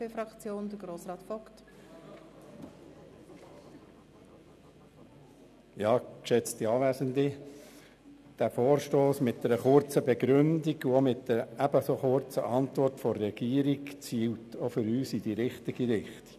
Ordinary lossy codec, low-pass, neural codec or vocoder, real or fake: none; 14.4 kHz; none; real